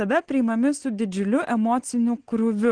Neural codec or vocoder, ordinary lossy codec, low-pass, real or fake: none; Opus, 16 kbps; 9.9 kHz; real